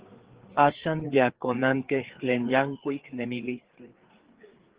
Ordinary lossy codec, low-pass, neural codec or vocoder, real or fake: Opus, 16 kbps; 3.6 kHz; codec, 16 kHz, 4 kbps, FreqCodec, larger model; fake